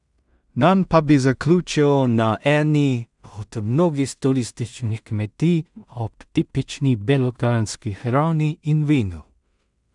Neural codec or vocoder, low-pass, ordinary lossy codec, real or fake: codec, 16 kHz in and 24 kHz out, 0.4 kbps, LongCat-Audio-Codec, two codebook decoder; 10.8 kHz; none; fake